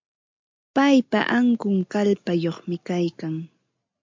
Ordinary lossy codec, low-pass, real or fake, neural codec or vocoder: MP3, 64 kbps; 7.2 kHz; real; none